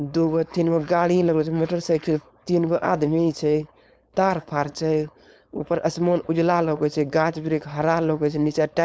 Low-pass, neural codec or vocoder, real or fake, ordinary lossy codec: none; codec, 16 kHz, 4.8 kbps, FACodec; fake; none